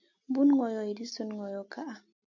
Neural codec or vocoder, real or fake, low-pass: none; real; 7.2 kHz